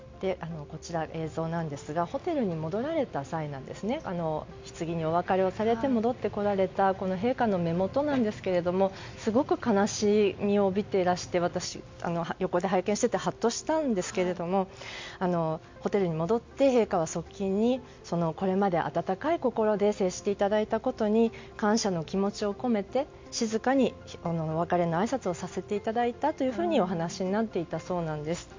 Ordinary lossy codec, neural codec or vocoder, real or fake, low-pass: MP3, 64 kbps; none; real; 7.2 kHz